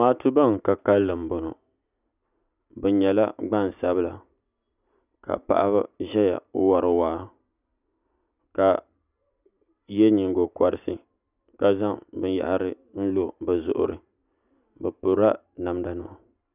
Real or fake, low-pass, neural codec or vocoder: real; 3.6 kHz; none